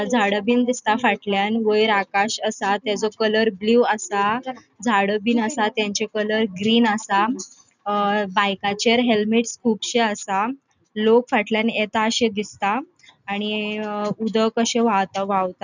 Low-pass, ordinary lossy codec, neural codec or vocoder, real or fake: 7.2 kHz; none; none; real